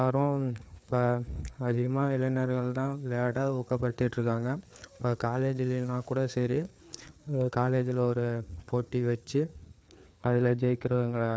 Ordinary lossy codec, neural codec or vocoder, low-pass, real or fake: none; codec, 16 kHz, 4 kbps, FreqCodec, larger model; none; fake